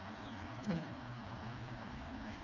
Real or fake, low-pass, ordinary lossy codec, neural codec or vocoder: fake; 7.2 kHz; none; codec, 16 kHz, 2 kbps, FreqCodec, smaller model